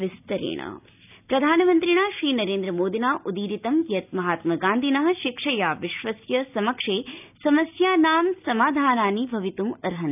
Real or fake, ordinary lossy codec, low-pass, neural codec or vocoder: real; none; 3.6 kHz; none